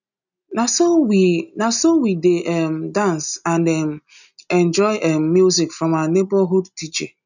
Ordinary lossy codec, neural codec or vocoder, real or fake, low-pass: none; none; real; 7.2 kHz